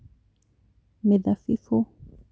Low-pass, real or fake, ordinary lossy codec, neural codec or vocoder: none; real; none; none